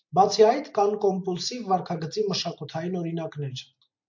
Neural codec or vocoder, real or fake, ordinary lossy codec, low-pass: none; real; MP3, 48 kbps; 7.2 kHz